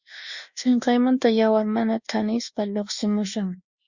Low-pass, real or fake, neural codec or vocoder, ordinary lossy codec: 7.2 kHz; fake; codec, 24 kHz, 1.2 kbps, DualCodec; Opus, 64 kbps